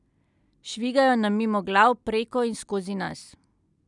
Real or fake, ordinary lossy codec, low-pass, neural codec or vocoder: real; none; 10.8 kHz; none